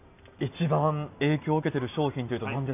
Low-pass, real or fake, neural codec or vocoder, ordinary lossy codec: 3.6 kHz; real; none; none